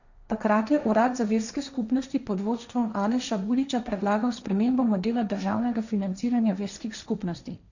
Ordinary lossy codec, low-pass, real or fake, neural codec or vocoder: none; 7.2 kHz; fake; codec, 16 kHz, 1.1 kbps, Voila-Tokenizer